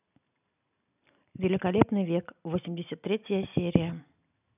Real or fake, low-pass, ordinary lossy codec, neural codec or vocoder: real; 3.6 kHz; none; none